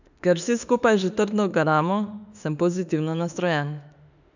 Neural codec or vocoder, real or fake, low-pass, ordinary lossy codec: autoencoder, 48 kHz, 32 numbers a frame, DAC-VAE, trained on Japanese speech; fake; 7.2 kHz; none